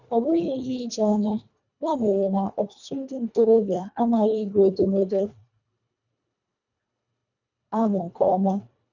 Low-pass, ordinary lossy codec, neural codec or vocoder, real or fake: 7.2 kHz; none; codec, 24 kHz, 1.5 kbps, HILCodec; fake